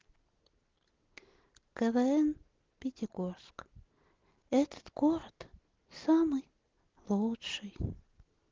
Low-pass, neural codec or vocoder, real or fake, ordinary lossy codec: 7.2 kHz; none; real; Opus, 16 kbps